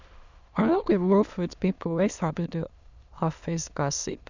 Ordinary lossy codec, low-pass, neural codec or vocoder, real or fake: Opus, 64 kbps; 7.2 kHz; autoencoder, 22.05 kHz, a latent of 192 numbers a frame, VITS, trained on many speakers; fake